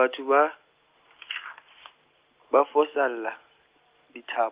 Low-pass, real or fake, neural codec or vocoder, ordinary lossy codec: 3.6 kHz; real; none; Opus, 32 kbps